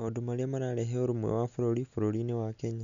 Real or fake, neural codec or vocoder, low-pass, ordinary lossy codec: real; none; 7.2 kHz; MP3, 64 kbps